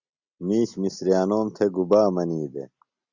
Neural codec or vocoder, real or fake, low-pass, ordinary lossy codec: none; real; 7.2 kHz; Opus, 64 kbps